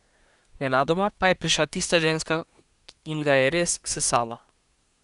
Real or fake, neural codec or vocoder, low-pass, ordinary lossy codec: fake; codec, 24 kHz, 1 kbps, SNAC; 10.8 kHz; none